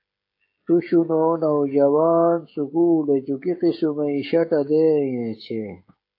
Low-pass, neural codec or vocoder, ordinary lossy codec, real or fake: 5.4 kHz; codec, 16 kHz, 16 kbps, FreqCodec, smaller model; AAC, 32 kbps; fake